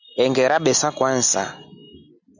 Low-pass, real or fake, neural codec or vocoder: 7.2 kHz; real; none